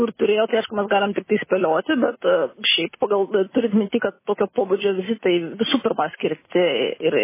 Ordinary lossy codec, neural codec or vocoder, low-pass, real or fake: MP3, 16 kbps; none; 3.6 kHz; real